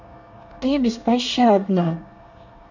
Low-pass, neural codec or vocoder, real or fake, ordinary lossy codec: 7.2 kHz; codec, 24 kHz, 1 kbps, SNAC; fake; none